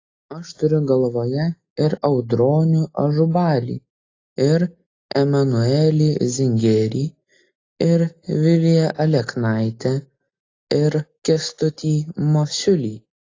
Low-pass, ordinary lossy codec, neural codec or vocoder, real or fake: 7.2 kHz; AAC, 32 kbps; none; real